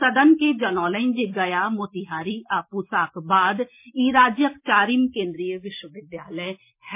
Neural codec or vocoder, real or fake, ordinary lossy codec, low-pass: none; real; MP3, 24 kbps; 3.6 kHz